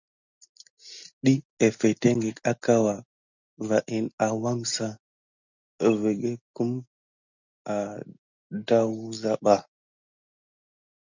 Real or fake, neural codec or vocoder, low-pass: real; none; 7.2 kHz